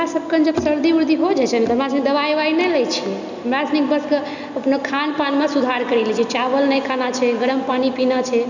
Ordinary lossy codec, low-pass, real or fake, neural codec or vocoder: none; 7.2 kHz; real; none